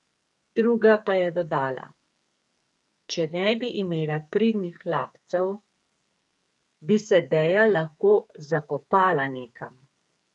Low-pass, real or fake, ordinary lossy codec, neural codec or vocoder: 10.8 kHz; fake; none; codec, 44.1 kHz, 2.6 kbps, SNAC